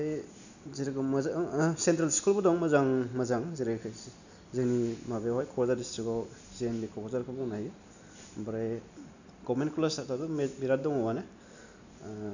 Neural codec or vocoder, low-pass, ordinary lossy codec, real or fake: none; 7.2 kHz; none; real